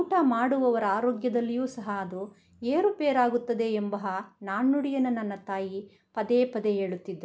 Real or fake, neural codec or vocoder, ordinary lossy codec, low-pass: real; none; none; none